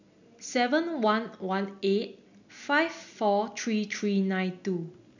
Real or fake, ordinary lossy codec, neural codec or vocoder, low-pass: real; none; none; 7.2 kHz